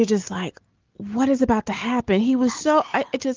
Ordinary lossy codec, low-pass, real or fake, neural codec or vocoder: Opus, 32 kbps; 7.2 kHz; real; none